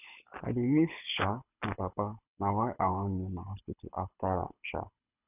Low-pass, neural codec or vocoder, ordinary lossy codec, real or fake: 3.6 kHz; codec, 24 kHz, 6 kbps, HILCodec; none; fake